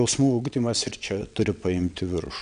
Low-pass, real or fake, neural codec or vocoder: 9.9 kHz; fake; vocoder, 22.05 kHz, 80 mel bands, WaveNeXt